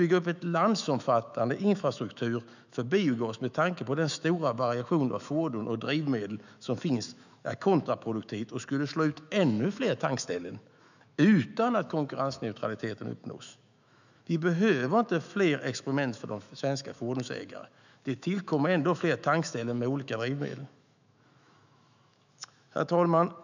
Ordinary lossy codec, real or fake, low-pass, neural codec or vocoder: none; fake; 7.2 kHz; autoencoder, 48 kHz, 128 numbers a frame, DAC-VAE, trained on Japanese speech